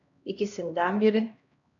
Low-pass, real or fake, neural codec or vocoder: 7.2 kHz; fake; codec, 16 kHz, 1 kbps, X-Codec, HuBERT features, trained on LibriSpeech